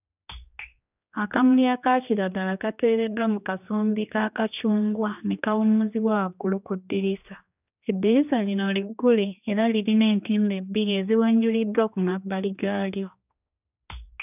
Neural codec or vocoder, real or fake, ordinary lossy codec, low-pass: codec, 16 kHz, 2 kbps, X-Codec, HuBERT features, trained on general audio; fake; none; 3.6 kHz